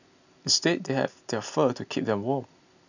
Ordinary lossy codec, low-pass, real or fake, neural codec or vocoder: none; 7.2 kHz; real; none